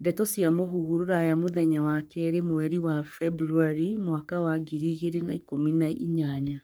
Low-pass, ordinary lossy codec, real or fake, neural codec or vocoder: none; none; fake; codec, 44.1 kHz, 3.4 kbps, Pupu-Codec